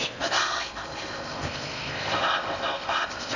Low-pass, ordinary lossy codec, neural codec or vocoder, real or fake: 7.2 kHz; none; codec, 16 kHz in and 24 kHz out, 0.6 kbps, FocalCodec, streaming, 4096 codes; fake